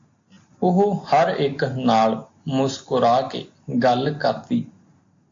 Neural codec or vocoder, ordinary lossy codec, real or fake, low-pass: none; AAC, 48 kbps; real; 7.2 kHz